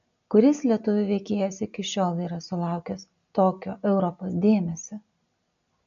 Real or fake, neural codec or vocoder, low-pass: real; none; 7.2 kHz